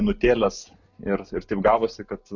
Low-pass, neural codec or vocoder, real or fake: 7.2 kHz; none; real